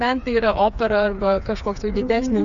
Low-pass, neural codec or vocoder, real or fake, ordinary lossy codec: 7.2 kHz; codec, 16 kHz, 4 kbps, FreqCodec, smaller model; fake; MP3, 96 kbps